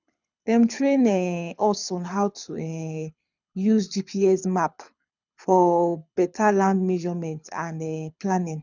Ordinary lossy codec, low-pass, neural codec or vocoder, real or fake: none; 7.2 kHz; codec, 24 kHz, 6 kbps, HILCodec; fake